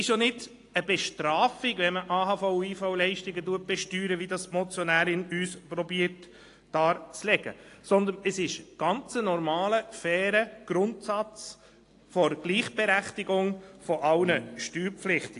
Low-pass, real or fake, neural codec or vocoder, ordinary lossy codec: 10.8 kHz; real; none; AAC, 48 kbps